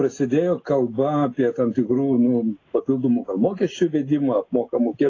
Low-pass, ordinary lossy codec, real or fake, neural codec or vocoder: 7.2 kHz; AAC, 32 kbps; real; none